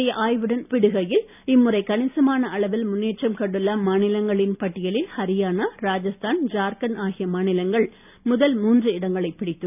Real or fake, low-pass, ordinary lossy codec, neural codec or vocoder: real; 3.6 kHz; none; none